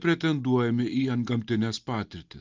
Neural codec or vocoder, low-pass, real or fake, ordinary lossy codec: none; 7.2 kHz; real; Opus, 24 kbps